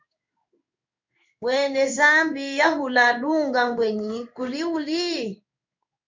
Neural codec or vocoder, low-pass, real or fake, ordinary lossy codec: codec, 16 kHz in and 24 kHz out, 1 kbps, XY-Tokenizer; 7.2 kHz; fake; MP3, 64 kbps